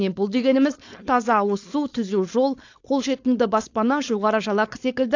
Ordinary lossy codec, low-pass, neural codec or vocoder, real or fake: AAC, 48 kbps; 7.2 kHz; codec, 16 kHz, 4.8 kbps, FACodec; fake